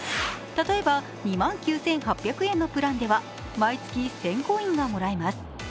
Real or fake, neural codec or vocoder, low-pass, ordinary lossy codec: real; none; none; none